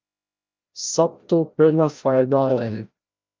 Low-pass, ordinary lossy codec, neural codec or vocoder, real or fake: 7.2 kHz; Opus, 24 kbps; codec, 16 kHz, 0.5 kbps, FreqCodec, larger model; fake